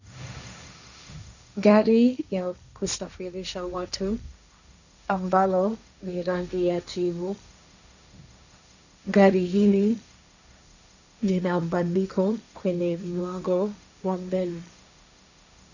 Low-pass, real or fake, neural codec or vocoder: 7.2 kHz; fake; codec, 16 kHz, 1.1 kbps, Voila-Tokenizer